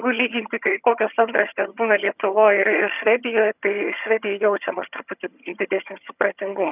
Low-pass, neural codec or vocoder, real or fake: 3.6 kHz; vocoder, 22.05 kHz, 80 mel bands, HiFi-GAN; fake